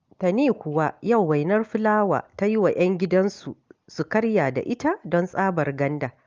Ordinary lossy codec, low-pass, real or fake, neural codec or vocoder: Opus, 32 kbps; 7.2 kHz; real; none